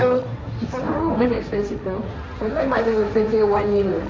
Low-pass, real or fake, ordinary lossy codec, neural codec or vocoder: none; fake; none; codec, 16 kHz, 1.1 kbps, Voila-Tokenizer